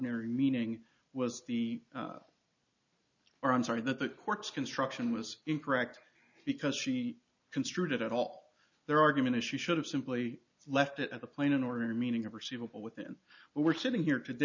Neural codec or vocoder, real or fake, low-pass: none; real; 7.2 kHz